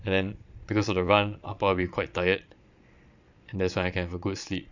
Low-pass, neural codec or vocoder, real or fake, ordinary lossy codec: 7.2 kHz; vocoder, 22.05 kHz, 80 mel bands, Vocos; fake; none